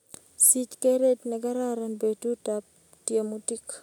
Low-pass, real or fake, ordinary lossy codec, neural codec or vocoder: 19.8 kHz; real; none; none